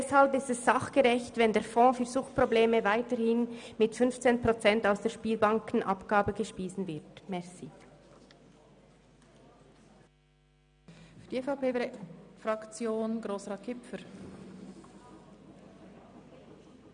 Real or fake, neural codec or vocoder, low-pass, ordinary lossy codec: real; none; 9.9 kHz; none